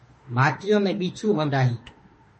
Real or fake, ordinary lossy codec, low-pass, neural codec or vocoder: fake; MP3, 32 kbps; 10.8 kHz; autoencoder, 48 kHz, 32 numbers a frame, DAC-VAE, trained on Japanese speech